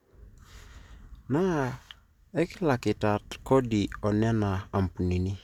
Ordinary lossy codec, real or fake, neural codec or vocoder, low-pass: none; real; none; 19.8 kHz